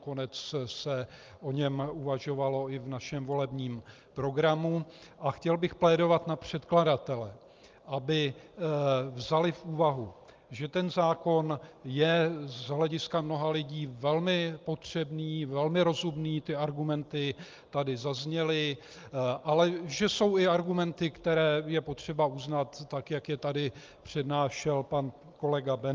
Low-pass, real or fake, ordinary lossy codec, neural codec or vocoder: 7.2 kHz; real; Opus, 24 kbps; none